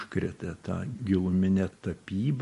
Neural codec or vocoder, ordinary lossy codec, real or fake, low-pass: none; MP3, 48 kbps; real; 14.4 kHz